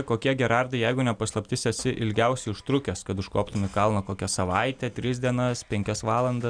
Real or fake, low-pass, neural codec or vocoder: real; 9.9 kHz; none